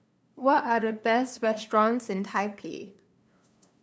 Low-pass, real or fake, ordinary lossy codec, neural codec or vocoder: none; fake; none; codec, 16 kHz, 2 kbps, FunCodec, trained on LibriTTS, 25 frames a second